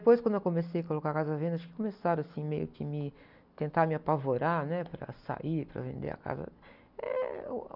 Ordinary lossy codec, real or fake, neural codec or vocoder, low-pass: none; real; none; 5.4 kHz